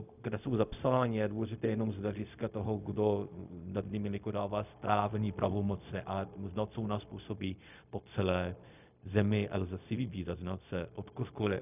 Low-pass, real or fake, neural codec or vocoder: 3.6 kHz; fake; codec, 16 kHz, 0.4 kbps, LongCat-Audio-Codec